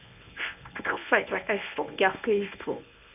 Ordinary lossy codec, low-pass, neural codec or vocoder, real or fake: none; 3.6 kHz; codec, 24 kHz, 0.9 kbps, WavTokenizer, medium speech release version 1; fake